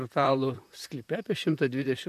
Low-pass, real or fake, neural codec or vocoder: 14.4 kHz; fake; vocoder, 44.1 kHz, 128 mel bands, Pupu-Vocoder